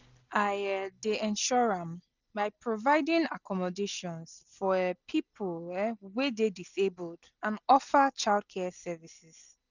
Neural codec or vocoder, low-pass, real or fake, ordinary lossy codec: none; 7.2 kHz; real; none